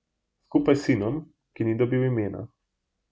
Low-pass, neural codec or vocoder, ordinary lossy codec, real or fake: none; none; none; real